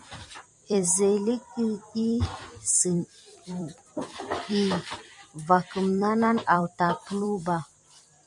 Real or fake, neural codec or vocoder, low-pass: fake; vocoder, 44.1 kHz, 128 mel bands every 256 samples, BigVGAN v2; 10.8 kHz